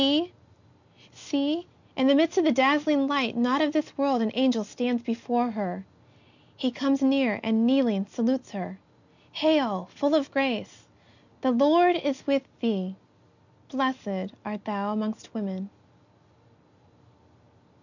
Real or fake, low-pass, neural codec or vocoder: real; 7.2 kHz; none